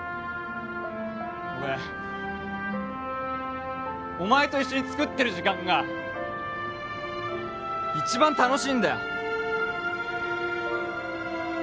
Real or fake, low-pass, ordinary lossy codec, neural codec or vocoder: real; none; none; none